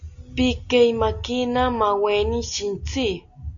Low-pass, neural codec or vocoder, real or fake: 7.2 kHz; none; real